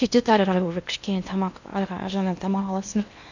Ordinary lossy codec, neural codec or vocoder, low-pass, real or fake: none; codec, 16 kHz in and 24 kHz out, 0.8 kbps, FocalCodec, streaming, 65536 codes; 7.2 kHz; fake